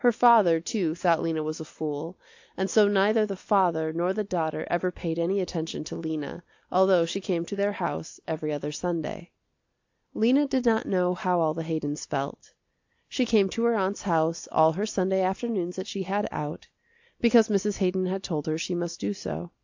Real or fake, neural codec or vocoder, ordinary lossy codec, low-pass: real; none; AAC, 48 kbps; 7.2 kHz